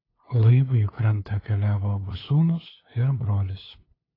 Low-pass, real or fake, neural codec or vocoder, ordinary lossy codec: 5.4 kHz; fake; codec, 16 kHz, 2 kbps, FunCodec, trained on LibriTTS, 25 frames a second; AAC, 24 kbps